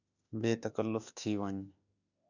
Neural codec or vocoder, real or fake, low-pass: autoencoder, 48 kHz, 32 numbers a frame, DAC-VAE, trained on Japanese speech; fake; 7.2 kHz